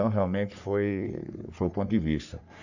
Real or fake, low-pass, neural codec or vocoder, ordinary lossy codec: fake; 7.2 kHz; codec, 44.1 kHz, 3.4 kbps, Pupu-Codec; none